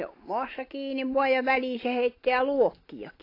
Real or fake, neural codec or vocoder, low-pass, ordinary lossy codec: real; none; 5.4 kHz; AAC, 32 kbps